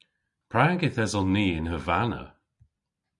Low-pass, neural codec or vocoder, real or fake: 10.8 kHz; none; real